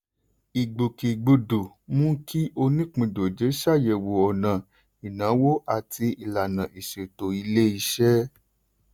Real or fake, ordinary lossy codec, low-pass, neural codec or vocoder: real; none; none; none